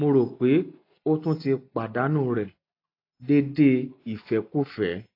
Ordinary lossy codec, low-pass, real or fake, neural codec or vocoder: AAC, 32 kbps; 5.4 kHz; real; none